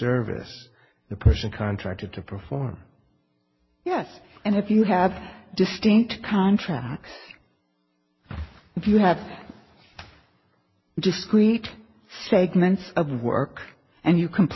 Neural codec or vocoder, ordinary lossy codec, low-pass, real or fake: none; MP3, 24 kbps; 7.2 kHz; real